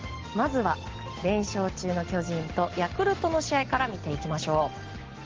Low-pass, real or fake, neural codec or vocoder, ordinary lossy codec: 7.2 kHz; real; none; Opus, 16 kbps